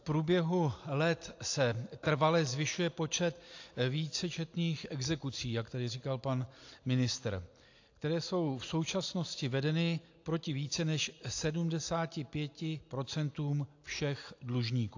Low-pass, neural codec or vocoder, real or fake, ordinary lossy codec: 7.2 kHz; none; real; AAC, 48 kbps